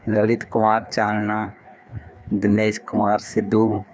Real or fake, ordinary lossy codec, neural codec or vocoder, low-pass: fake; none; codec, 16 kHz, 2 kbps, FreqCodec, larger model; none